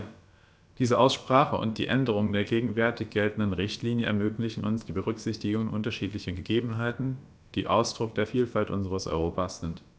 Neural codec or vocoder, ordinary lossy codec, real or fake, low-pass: codec, 16 kHz, about 1 kbps, DyCAST, with the encoder's durations; none; fake; none